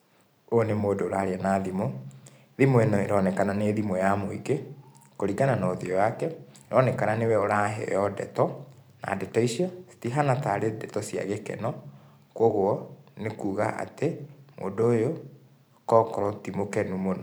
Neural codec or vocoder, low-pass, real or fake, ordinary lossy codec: vocoder, 44.1 kHz, 128 mel bands every 512 samples, BigVGAN v2; none; fake; none